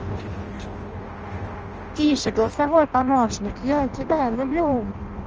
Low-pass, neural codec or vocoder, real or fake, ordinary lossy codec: 7.2 kHz; codec, 16 kHz in and 24 kHz out, 0.6 kbps, FireRedTTS-2 codec; fake; Opus, 24 kbps